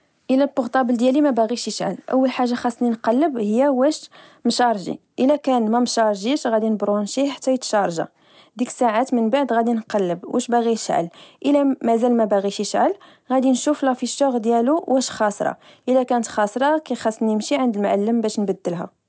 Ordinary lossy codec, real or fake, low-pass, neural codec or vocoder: none; real; none; none